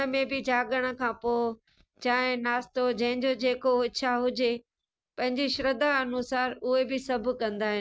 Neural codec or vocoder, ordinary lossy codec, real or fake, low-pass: none; none; real; none